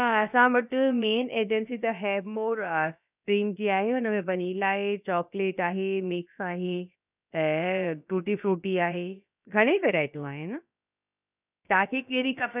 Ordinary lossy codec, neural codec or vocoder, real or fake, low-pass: none; codec, 16 kHz, about 1 kbps, DyCAST, with the encoder's durations; fake; 3.6 kHz